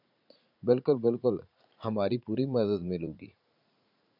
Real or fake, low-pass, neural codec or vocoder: fake; 5.4 kHz; vocoder, 44.1 kHz, 80 mel bands, Vocos